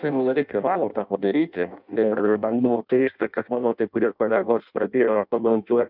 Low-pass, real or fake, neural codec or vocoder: 5.4 kHz; fake; codec, 16 kHz in and 24 kHz out, 0.6 kbps, FireRedTTS-2 codec